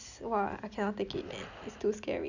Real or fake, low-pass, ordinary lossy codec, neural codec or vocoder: real; 7.2 kHz; Opus, 64 kbps; none